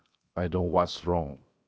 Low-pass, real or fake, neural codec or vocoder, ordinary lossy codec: none; fake; codec, 16 kHz, 0.7 kbps, FocalCodec; none